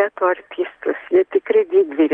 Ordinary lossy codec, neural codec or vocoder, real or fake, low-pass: Opus, 24 kbps; none; real; 9.9 kHz